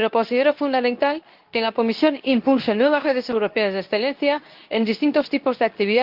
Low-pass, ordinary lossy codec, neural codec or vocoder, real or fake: 5.4 kHz; Opus, 32 kbps; codec, 24 kHz, 0.9 kbps, WavTokenizer, medium speech release version 2; fake